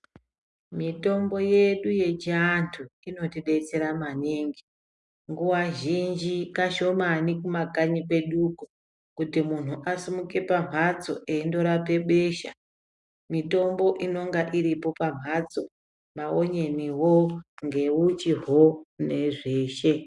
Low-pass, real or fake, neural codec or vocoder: 10.8 kHz; real; none